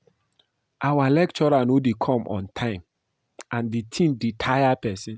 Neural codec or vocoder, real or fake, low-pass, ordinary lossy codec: none; real; none; none